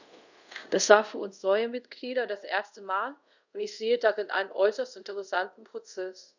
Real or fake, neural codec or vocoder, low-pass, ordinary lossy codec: fake; codec, 24 kHz, 0.5 kbps, DualCodec; 7.2 kHz; none